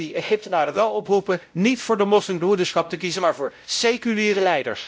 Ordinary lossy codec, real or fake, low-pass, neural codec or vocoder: none; fake; none; codec, 16 kHz, 0.5 kbps, X-Codec, WavLM features, trained on Multilingual LibriSpeech